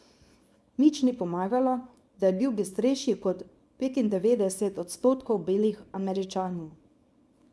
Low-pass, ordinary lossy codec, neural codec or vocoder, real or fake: none; none; codec, 24 kHz, 0.9 kbps, WavTokenizer, small release; fake